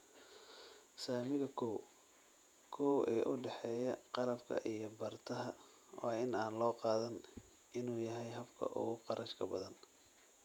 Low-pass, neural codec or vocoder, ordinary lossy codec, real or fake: 19.8 kHz; vocoder, 48 kHz, 128 mel bands, Vocos; none; fake